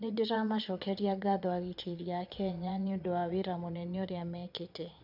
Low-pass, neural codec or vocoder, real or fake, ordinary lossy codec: 5.4 kHz; vocoder, 22.05 kHz, 80 mel bands, Vocos; fake; none